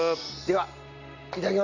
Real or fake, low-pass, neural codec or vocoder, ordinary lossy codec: real; 7.2 kHz; none; none